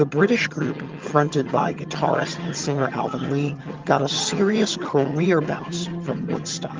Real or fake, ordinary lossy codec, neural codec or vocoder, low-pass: fake; Opus, 32 kbps; vocoder, 22.05 kHz, 80 mel bands, HiFi-GAN; 7.2 kHz